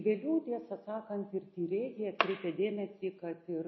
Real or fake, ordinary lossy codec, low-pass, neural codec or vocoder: real; MP3, 24 kbps; 7.2 kHz; none